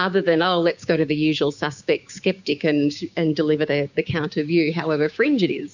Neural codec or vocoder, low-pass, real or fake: codec, 16 kHz, 6 kbps, DAC; 7.2 kHz; fake